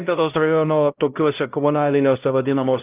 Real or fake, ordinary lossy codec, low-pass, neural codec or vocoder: fake; Opus, 24 kbps; 3.6 kHz; codec, 16 kHz, 0.5 kbps, X-Codec, HuBERT features, trained on LibriSpeech